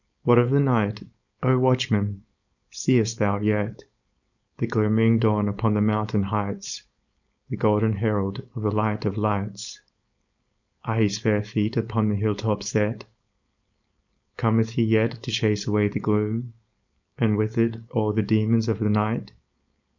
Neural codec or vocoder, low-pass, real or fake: codec, 16 kHz, 4.8 kbps, FACodec; 7.2 kHz; fake